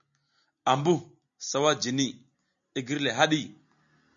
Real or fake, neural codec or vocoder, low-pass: real; none; 7.2 kHz